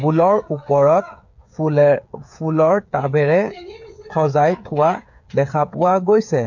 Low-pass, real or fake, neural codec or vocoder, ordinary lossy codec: 7.2 kHz; fake; codec, 16 kHz, 8 kbps, FreqCodec, smaller model; none